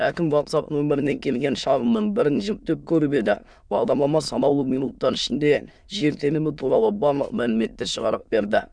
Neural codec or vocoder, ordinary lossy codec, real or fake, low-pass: autoencoder, 22.05 kHz, a latent of 192 numbers a frame, VITS, trained on many speakers; none; fake; 9.9 kHz